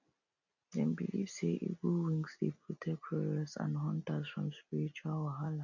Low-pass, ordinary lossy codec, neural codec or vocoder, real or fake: 7.2 kHz; none; none; real